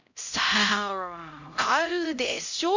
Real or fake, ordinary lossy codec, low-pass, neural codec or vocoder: fake; none; 7.2 kHz; codec, 16 kHz, 0.5 kbps, X-Codec, HuBERT features, trained on LibriSpeech